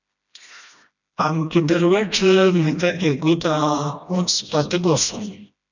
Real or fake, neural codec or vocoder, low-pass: fake; codec, 16 kHz, 1 kbps, FreqCodec, smaller model; 7.2 kHz